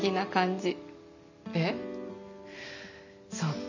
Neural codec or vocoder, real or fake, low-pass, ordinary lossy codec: none; real; 7.2 kHz; none